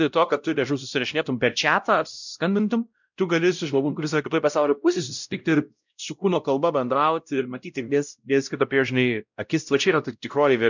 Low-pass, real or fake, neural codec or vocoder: 7.2 kHz; fake; codec, 16 kHz, 0.5 kbps, X-Codec, WavLM features, trained on Multilingual LibriSpeech